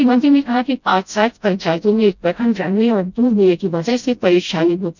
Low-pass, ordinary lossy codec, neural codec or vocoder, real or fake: 7.2 kHz; none; codec, 16 kHz, 0.5 kbps, FreqCodec, smaller model; fake